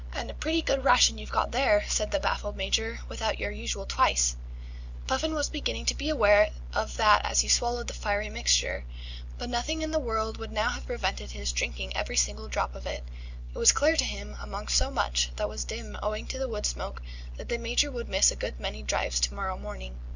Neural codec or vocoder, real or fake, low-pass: none; real; 7.2 kHz